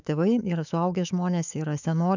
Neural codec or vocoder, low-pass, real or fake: autoencoder, 48 kHz, 128 numbers a frame, DAC-VAE, trained on Japanese speech; 7.2 kHz; fake